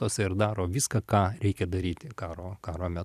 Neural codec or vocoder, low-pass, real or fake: vocoder, 44.1 kHz, 128 mel bands every 256 samples, BigVGAN v2; 14.4 kHz; fake